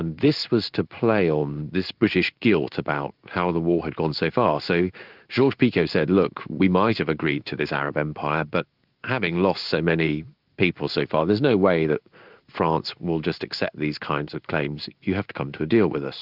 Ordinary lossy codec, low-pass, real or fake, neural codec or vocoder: Opus, 24 kbps; 5.4 kHz; fake; codec, 16 kHz in and 24 kHz out, 1 kbps, XY-Tokenizer